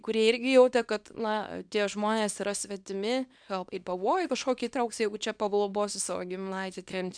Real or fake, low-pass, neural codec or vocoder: fake; 9.9 kHz; codec, 24 kHz, 0.9 kbps, WavTokenizer, small release